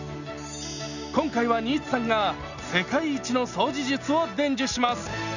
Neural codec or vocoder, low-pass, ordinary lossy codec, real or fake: none; 7.2 kHz; none; real